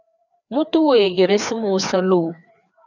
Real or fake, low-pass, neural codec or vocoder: fake; 7.2 kHz; codec, 16 kHz, 2 kbps, FreqCodec, larger model